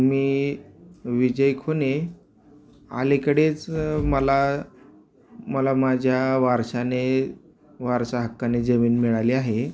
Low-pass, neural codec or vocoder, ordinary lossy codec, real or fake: none; none; none; real